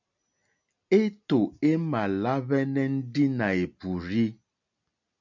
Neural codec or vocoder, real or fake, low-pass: none; real; 7.2 kHz